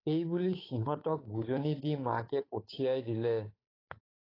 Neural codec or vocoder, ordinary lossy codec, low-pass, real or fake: codec, 16 kHz, 8 kbps, FunCodec, trained on Chinese and English, 25 frames a second; AAC, 24 kbps; 5.4 kHz; fake